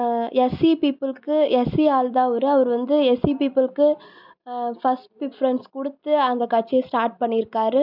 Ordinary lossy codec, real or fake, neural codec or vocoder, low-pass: none; real; none; 5.4 kHz